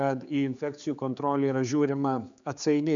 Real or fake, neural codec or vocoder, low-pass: fake; codec, 16 kHz, 4 kbps, X-Codec, HuBERT features, trained on general audio; 7.2 kHz